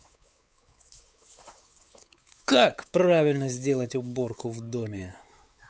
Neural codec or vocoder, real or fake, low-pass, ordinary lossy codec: codec, 16 kHz, 4 kbps, X-Codec, WavLM features, trained on Multilingual LibriSpeech; fake; none; none